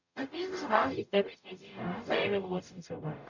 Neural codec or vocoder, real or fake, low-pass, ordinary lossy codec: codec, 44.1 kHz, 0.9 kbps, DAC; fake; 7.2 kHz; none